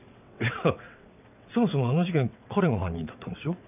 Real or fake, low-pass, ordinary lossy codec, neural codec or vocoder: fake; 3.6 kHz; none; vocoder, 22.05 kHz, 80 mel bands, WaveNeXt